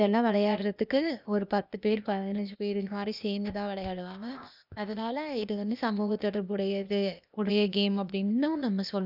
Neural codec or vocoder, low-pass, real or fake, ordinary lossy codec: codec, 16 kHz, 0.8 kbps, ZipCodec; 5.4 kHz; fake; none